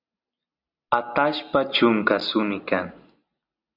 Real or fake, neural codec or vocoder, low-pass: real; none; 5.4 kHz